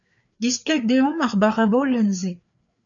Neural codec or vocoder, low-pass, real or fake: codec, 16 kHz, 4 kbps, FreqCodec, larger model; 7.2 kHz; fake